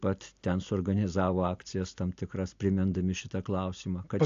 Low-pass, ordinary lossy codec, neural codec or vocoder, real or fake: 7.2 kHz; AAC, 64 kbps; none; real